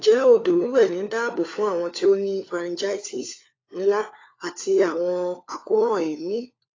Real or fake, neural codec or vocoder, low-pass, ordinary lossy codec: fake; codec, 16 kHz, 4 kbps, FunCodec, trained on LibriTTS, 50 frames a second; 7.2 kHz; AAC, 32 kbps